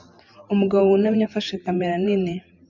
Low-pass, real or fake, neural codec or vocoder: 7.2 kHz; fake; vocoder, 44.1 kHz, 128 mel bands every 512 samples, BigVGAN v2